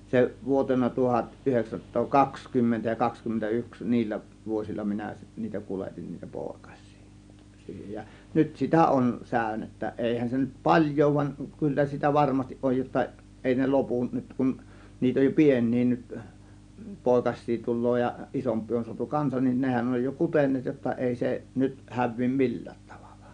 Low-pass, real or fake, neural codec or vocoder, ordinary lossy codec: 9.9 kHz; real; none; none